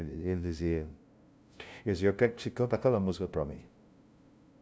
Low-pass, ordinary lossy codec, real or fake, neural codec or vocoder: none; none; fake; codec, 16 kHz, 0.5 kbps, FunCodec, trained on LibriTTS, 25 frames a second